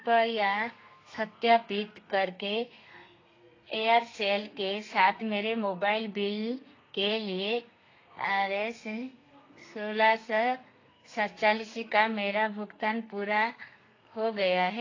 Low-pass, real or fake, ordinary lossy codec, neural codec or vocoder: 7.2 kHz; fake; AAC, 32 kbps; codec, 32 kHz, 1.9 kbps, SNAC